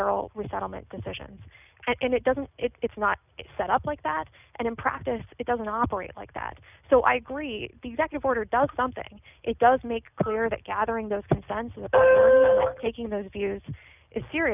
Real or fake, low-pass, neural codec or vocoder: real; 3.6 kHz; none